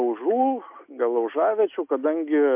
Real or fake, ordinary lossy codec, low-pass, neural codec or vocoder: real; MP3, 32 kbps; 3.6 kHz; none